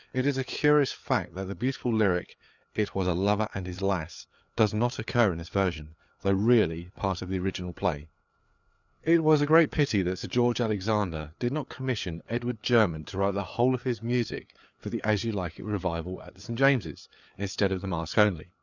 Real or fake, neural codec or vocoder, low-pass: fake; codec, 16 kHz, 4 kbps, FreqCodec, larger model; 7.2 kHz